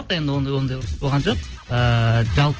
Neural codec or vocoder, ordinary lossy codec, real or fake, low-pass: none; Opus, 16 kbps; real; 7.2 kHz